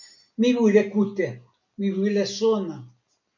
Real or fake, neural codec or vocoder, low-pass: real; none; 7.2 kHz